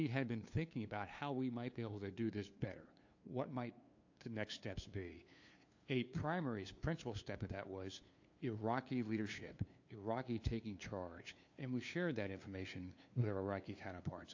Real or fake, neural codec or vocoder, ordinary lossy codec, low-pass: fake; codec, 16 kHz, 2 kbps, FunCodec, trained on LibriTTS, 25 frames a second; AAC, 48 kbps; 7.2 kHz